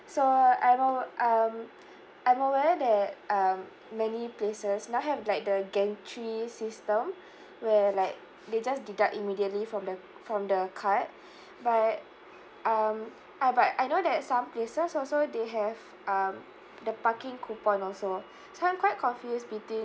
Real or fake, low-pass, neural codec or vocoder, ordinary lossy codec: real; none; none; none